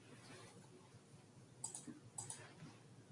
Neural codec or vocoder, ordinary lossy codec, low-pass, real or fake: none; Opus, 64 kbps; 10.8 kHz; real